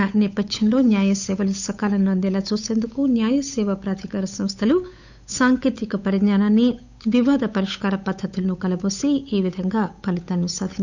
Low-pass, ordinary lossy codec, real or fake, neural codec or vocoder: 7.2 kHz; none; fake; codec, 16 kHz, 8 kbps, FunCodec, trained on Chinese and English, 25 frames a second